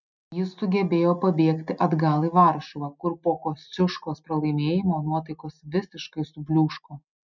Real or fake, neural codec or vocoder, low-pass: real; none; 7.2 kHz